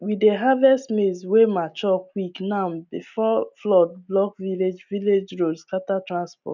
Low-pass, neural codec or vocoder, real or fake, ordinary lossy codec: 7.2 kHz; none; real; none